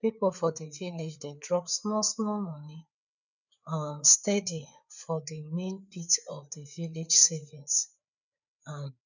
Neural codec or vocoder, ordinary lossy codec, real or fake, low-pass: codec, 16 kHz, 4 kbps, FreqCodec, larger model; none; fake; 7.2 kHz